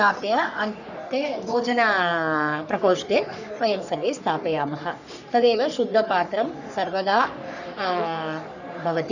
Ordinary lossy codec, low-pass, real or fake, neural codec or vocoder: none; 7.2 kHz; fake; codec, 44.1 kHz, 3.4 kbps, Pupu-Codec